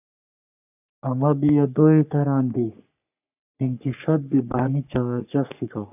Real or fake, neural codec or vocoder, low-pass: fake; codec, 44.1 kHz, 3.4 kbps, Pupu-Codec; 3.6 kHz